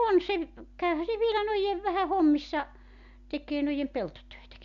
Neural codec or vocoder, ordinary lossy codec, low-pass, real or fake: none; none; 7.2 kHz; real